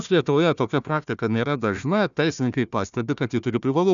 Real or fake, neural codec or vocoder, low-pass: fake; codec, 16 kHz, 1 kbps, FunCodec, trained on Chinese and English, 50 frames a second; 7.2 kHz